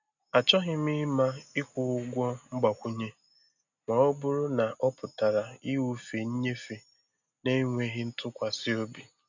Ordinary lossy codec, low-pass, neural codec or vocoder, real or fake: none; 7.2 kHz; none; real